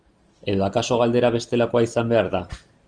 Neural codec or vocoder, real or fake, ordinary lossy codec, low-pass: none; real; Opus, 24 kbps; 9.9 kHz